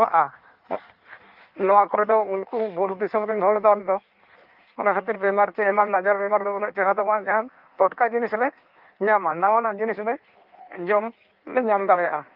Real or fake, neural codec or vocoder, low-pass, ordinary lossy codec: fake; codec, 16 kHz in and 24 kHz out, 1.1 kbps, FireRedTTS-2 codec; 5.4 kHz; Opus, 32 kbps